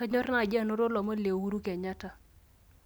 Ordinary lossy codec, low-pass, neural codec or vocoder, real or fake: none; none; none; real